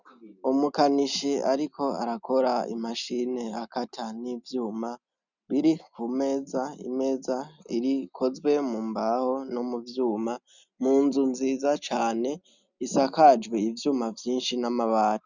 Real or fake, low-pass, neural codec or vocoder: real; 7.2 kHz; none